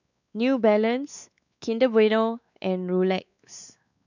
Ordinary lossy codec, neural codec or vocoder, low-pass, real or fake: none; codec, 16 kHz, 4 kbps, X-Codec, WavLM features, trained on Multilingual LibriSpeech; 7.2 kHz; fake